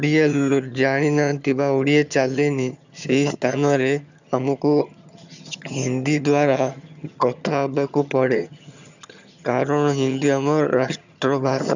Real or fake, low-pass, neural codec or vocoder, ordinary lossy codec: fake; 7.2 kHz; vocoder, 22.05 kHz, 80 mel bands, HiFi-GAN; none